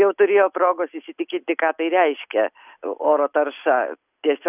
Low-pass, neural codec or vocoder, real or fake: 3.6 kHz; none; real